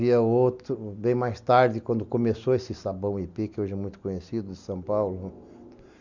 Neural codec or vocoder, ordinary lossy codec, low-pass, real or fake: none; none; 7.2 kHz; real